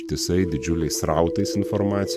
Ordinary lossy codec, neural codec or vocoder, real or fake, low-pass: AAC, 96 kbps; vocoder, 44.1 kHz, 128 mel bands every 512 samples, BigVGAN v2; fake; 14.4 kHz